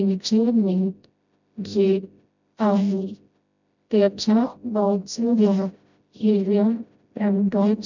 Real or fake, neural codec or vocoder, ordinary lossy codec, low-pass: fake; codec, 16 kHz, 0.5 kbps, FreqCodec, smaller model; none; 7.2 kHz